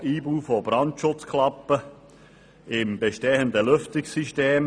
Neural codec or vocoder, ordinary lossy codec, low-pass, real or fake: none; none; 9.9 kHz; real